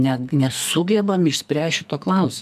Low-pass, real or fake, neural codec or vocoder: 14.4 kHz; fake; codec, 44.1 kHz, 2.6 kbps, SNAC